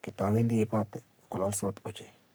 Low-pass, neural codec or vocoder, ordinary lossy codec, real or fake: none; codec, 44.1 kHz, 3.4 kbps, Pupu-Codec; none; fake